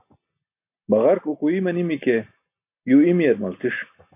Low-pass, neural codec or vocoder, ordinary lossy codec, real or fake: 3.6 kHz; none; AAC, 32 kbps; real